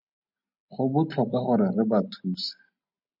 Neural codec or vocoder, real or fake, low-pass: none; real; 5.4 kHz